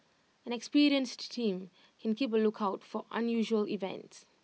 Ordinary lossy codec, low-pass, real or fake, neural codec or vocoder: none; none; real; none